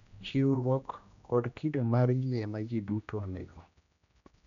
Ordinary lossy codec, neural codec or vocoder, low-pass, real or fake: none; codec, 16 kHz, 1 kbps, X-Codec, HuBERT features, trained on general audio; 7.2 kHz; fake